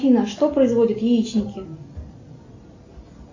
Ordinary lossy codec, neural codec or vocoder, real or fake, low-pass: AAC, 48 kbps; none; real; 7.2 kHz